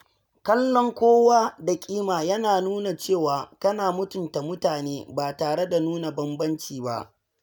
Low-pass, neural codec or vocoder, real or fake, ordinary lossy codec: none; none; real; none